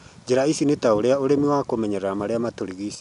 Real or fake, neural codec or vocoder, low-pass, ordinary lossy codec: real; none; 10.8 kHz; none